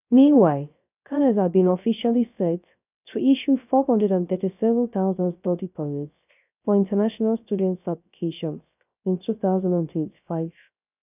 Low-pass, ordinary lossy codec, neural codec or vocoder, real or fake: 3.6 kHz; none; codec, 16 kHz, 0.3 kbps, FocalCodec; fake